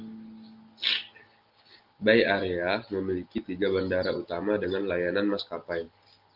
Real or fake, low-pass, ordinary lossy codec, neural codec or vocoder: real; 5.4 kHz; Opus, 32 kbps; none